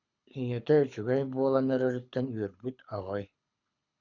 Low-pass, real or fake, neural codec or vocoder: 7.2 kHz; fake; codec, 24 kHz, 6 kbps, HILCodec